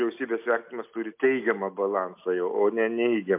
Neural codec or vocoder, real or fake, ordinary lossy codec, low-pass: none; real; MP3, 32 kbps; 3.6 kHz